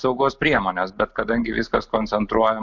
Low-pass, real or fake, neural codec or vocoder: 7.2 kHz; real; none